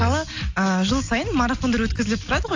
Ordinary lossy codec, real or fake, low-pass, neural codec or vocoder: AAC, 48 kbps; real; 7.2 kHz; none